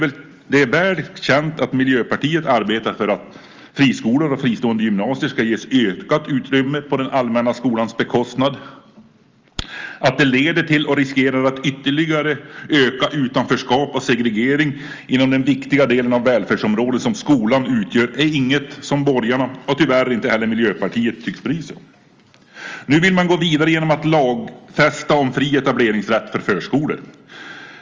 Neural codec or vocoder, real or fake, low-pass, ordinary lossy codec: none; real; 7.2 kHz; Opus, 32 kbps